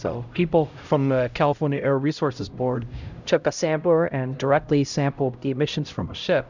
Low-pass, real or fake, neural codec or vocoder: 7.2 kHz; fake; codec, 16 kHz, 0.5 kbps, X-Codec, HuBERT features, trained on LibriSpeech